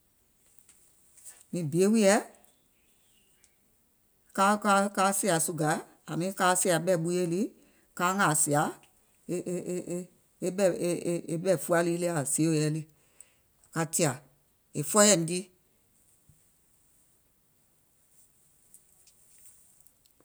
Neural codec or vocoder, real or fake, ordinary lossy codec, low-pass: none; real; none; none